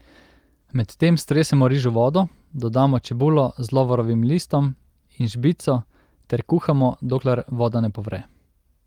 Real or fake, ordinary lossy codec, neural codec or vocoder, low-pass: real; Opus, 24 kbps; none; 19.8 kHz